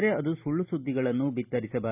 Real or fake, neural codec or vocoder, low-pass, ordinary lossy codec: real; none; 3.6 kHz; none